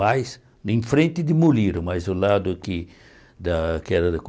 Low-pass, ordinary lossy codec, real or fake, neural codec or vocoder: none; none; real; none